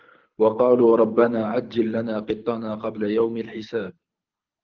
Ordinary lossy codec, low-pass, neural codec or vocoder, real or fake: Opus, 24 kbps; 7.2 kHz; codec, 24 kHz, 6 kbps, HILCodec; fake